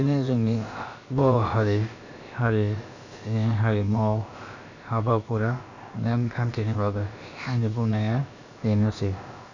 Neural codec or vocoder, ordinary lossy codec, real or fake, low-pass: codec, 16 kHz, about 1 kbps, DyCAST, with the encoder's durations; none; fake; 7.2 kHz